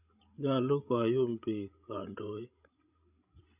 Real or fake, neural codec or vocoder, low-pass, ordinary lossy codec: fake; codec, 16 kHz, 16 kbps, FreqCodec, larger model; 3.6 kHz; none